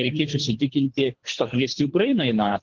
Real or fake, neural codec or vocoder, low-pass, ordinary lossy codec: fake; codec, 24 kHz, 3 kbps, HILCodec; 7.2 kHz; Opus, 16 kbps